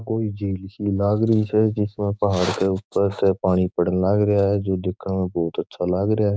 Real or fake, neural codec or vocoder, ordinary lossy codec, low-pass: fake; codec, 16 kHz, 6 kbps, DAC; none; none